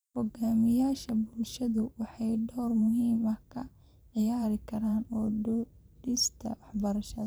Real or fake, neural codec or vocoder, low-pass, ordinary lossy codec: fake; vocoder, 44.1 kHz, 128 mel bands every 512 samples, BigVGAN v2; none; none